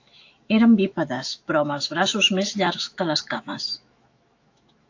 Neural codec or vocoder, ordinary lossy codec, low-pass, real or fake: autoencoder, 48 kHz, 128 numbers a frame, DAC-VAE, trained on Japanese speech; AAC, 48 kbps; 7.2 kHz; fake